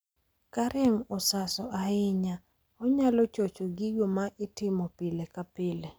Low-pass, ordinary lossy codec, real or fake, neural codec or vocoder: none; none; real; none